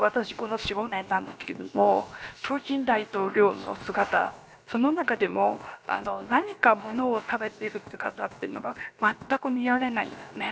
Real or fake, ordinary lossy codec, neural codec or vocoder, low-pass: fake; none; codec, 16 kHz, about 1 kbps, DyCAST, with the encoder's durations; none